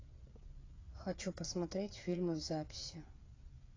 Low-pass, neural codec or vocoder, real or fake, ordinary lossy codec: 7.2 kHz; codec, 16 kHz, 8 kbps, FreqCodec, smaller model; fake; AAC, 32 kbps